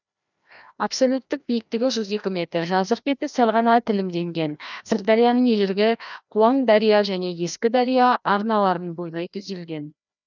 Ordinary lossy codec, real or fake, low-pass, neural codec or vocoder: none; fake; 7.2 kHz; codec, 16 kHz, 1 kbps, FreqCodec, larger model